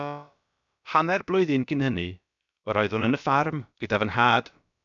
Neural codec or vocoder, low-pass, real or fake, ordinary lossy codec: codec, 16 kHz, about 1 kbps, DyCAST, with the encoder's durations; 7.2 kHz; fake; MP3, 96 kbps